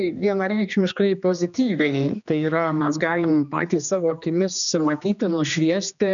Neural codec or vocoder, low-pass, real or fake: codec, 16 kHz, 2 kbps, X-Codec, HuBERT features, trained on general audio; 7.2 kHz; fake